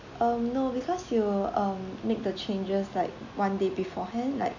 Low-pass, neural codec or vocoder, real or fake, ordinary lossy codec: 7.2 kHz; none; real; none